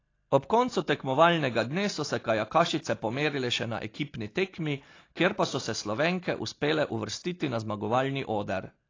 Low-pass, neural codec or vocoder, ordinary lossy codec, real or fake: 7.2 kHz; none; AAC, 32 kbps; real